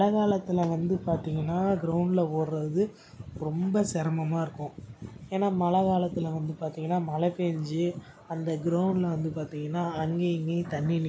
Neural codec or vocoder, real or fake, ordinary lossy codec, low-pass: none; real; none; none